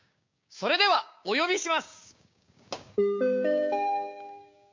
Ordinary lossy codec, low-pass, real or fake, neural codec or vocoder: MP3, 48 kbps; 7.2 kHz; fake; codec, 16 kHz, 6 kbps, DAC